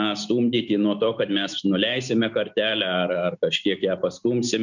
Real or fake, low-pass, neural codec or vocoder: real; 7.2 kHz; none